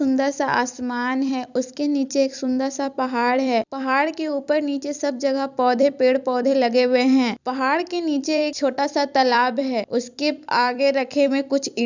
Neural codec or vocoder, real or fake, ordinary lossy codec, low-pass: vocoder, 44.1 kHz, 128 mel bands every 256 samples, BigVGAN v2; fake; none; 7.2 kHz